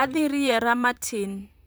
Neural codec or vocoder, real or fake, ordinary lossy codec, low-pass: vocoder, 44.1 kHz, 128 mel bands, Pupu-Vocoder; fake; none; none